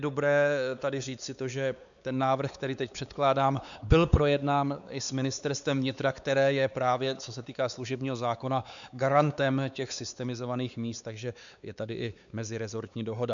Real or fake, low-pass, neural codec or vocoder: fake; 7.2 kHz; codec, 16 kHz, 4 kbps, X-Codec, WavLM features, trained on Multilingual LibriSpeech